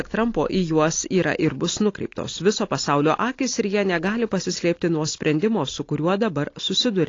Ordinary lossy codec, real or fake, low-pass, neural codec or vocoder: AAC, 32 kbps; real; 7.2 kHz; none